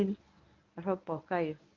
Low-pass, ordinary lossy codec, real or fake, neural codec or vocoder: 7.2 kHz; Opus, 32 kbps; fake; codec, 24 kHz, 0.9 kbps, WavTokenizer, medium speech release version 1